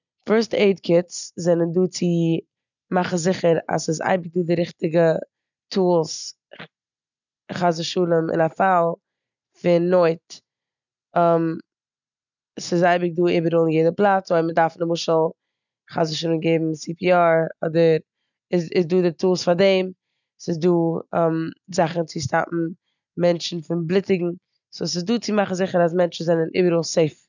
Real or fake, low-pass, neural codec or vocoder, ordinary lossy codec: real; 7.2 kHz; none; none